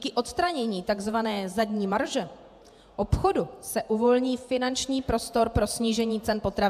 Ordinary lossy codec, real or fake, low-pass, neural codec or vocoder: AAC, 96 kbps; fake; 14.4 kHz; vocoder, 48 kHz, 128 mel bands, Vocos